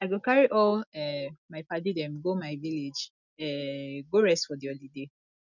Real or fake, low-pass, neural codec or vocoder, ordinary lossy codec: real; 7.2 kHz; none; none